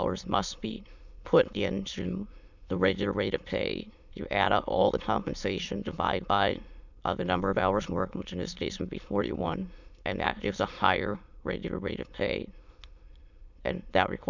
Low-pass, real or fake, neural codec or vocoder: 7.2 kHz; fake; autoencoder, 22.05 kHz, a latent of 192 numbers a frame, VITS, trained on many speakers